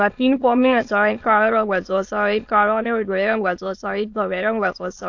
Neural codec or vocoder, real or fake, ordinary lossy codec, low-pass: autoencoder, 22.05 kHz, a latent of 192 numbers a frame, VITS, trained on many speakers; fake; AAC, 48 kbps; 7.2 kHz